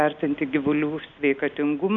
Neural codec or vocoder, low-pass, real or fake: none; 7.2 kHz; real